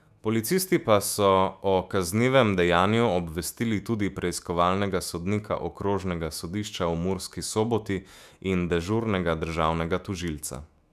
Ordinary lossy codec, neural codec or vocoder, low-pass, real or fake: none; autoencoder, 48 kHz, 128 numbers a frame, DAC-VAE, trained on Japanese speech; 14.4 kHz; fake